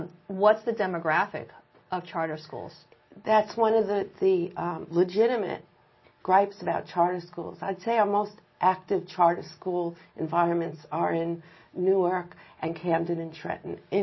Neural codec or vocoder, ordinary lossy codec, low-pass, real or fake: none; MP3, 24 kbps; 7.2 kHz; real